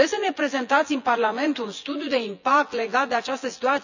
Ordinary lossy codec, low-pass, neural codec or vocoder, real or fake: none; 7.2 kHz; vocoder, 24 kHz, 100 mel bands, Vocos; fake